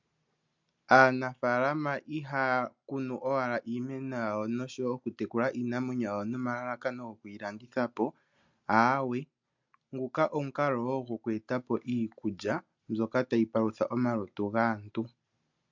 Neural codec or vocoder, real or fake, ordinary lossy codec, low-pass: none; real; MP3, 64 kbps; 7.2 kHz